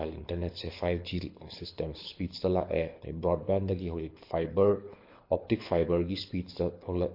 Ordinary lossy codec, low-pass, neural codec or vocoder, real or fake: MP3, 32 kbps; 5.4 kHz; codec, 24 kHz, 6 kbps, HILCodec; fake